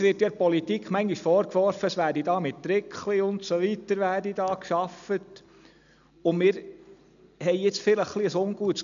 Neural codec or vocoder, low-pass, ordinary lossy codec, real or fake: none; 7.2 kHz; none; real